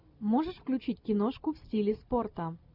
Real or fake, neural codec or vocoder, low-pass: real; none; 5.4 kHz